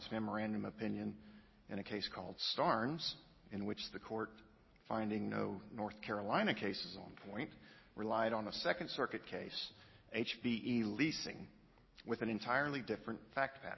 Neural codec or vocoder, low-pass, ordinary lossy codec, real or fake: none; 7.2 kHz; MP3, 24 kbps; real